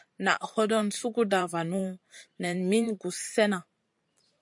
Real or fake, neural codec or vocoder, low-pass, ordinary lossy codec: fake; vocoder, 44.1 kHz, 128 mel bands every 512 samples, BigVGAN v2; 10.8 kHz; MP3, 64 kbps